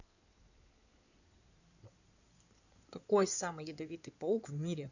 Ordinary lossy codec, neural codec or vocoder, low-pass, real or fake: none; codec, 16 kHz in and 24 kHz out, 2.2 kbps, FireRedTTS-2 codec; 7.2 kHz; fake